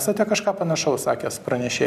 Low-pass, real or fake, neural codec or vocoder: 14.4 kHz; real; none